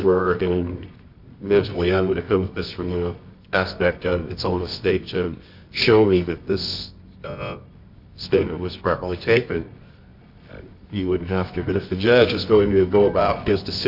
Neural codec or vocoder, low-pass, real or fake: codec, 24 kHz, 0.9 kbps, WavTokenizer, medium music audio release; 5.4 kHz; fake